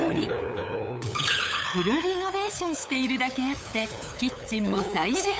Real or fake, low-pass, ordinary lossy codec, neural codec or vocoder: fake; none; none; codec, 16 kHz, 8 kbps, FunCodec, trained on LibriTTS, 25 frames a second